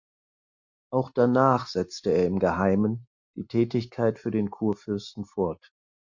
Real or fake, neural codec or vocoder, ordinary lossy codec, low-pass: real; none; Opus, 64 kbps; 7.2 kHz